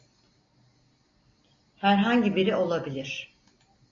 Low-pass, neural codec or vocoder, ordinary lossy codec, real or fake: 7.2 kHz; none; AAC, 32 kbps; real